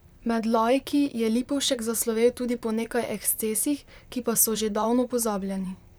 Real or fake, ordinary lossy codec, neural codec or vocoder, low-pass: fake; none; vocoder, 44.1 kHz, 128 mel bands, Pupu-Vocoder; none